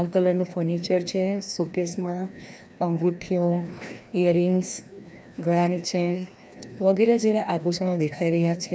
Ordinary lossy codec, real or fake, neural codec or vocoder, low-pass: none; fake; codec, 16 kHz, 1 kbps, FreqCodec, larger model; none